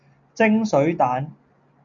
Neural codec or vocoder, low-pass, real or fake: none; 7.2 kHz; real